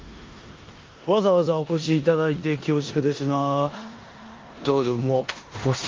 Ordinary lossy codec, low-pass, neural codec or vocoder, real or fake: Opus, 32 kbps; 7.2 kHz; codec, 16 kHz in and 24 kHz out, 0.9 kbps, LongCat-Audio-Codec, four codebook decoder; fake